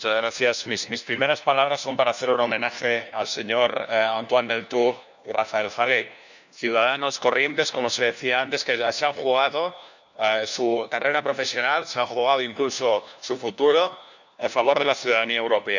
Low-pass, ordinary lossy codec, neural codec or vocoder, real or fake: 7.2 kHz; none; codec, 16 kHz, 1 kbps, FunCodec, trained on LibriTTS, 50 frames a second; fake